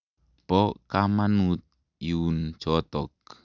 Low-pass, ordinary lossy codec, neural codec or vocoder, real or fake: 7.2 kHz; none; none; real